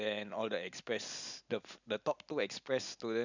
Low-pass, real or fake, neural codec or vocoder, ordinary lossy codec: 7.2 kHz; real; none; none